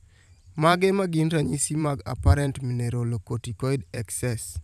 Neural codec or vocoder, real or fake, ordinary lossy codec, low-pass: vocoder, 44.1 kHz, 128 mel bands every 512 samples, BigVGAN v2; fake; none; 14.4 kHz